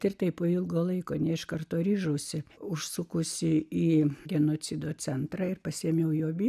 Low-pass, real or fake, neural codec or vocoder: 14.4 kHz; real; none